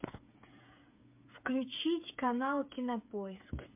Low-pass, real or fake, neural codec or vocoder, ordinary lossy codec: 3.6 kHz; fake; codec, 16 kHz, 8 kbps, FreqCodec, smaller model; MP3, 32 kbps